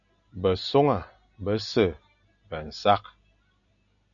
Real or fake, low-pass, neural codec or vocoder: real; 7.2 kHz; none